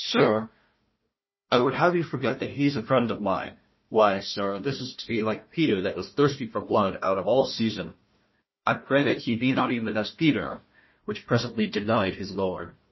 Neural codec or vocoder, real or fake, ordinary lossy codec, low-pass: codec, 16 kHz, 1 kbps, FunCodec, trained on Chinese and English, 50 frames a second; fake; MP3, 24 kbps; 7.2 kHz